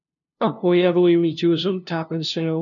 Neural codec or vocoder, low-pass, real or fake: codec, 16 kHz, 0.5 kbps, FunCodec, trained on LibriTTS, 25 frames a second; 7.2 kHz; fake